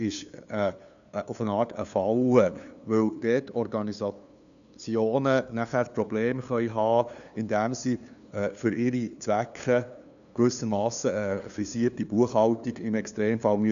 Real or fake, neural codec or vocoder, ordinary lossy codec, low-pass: fake; codec, 16 kHz, 2 kbps, FunCodec, trained on LibriTTS, 25 frames a second; none; 7.2 kHz